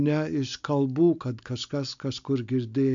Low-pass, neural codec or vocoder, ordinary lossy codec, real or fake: 7.2 kHz; none; MP3, 64 kbps; real